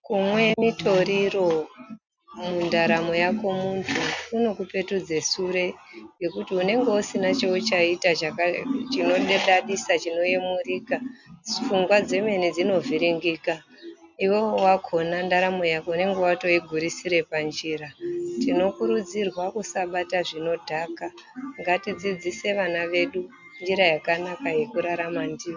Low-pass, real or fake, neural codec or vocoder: 7.2 kHz; real; none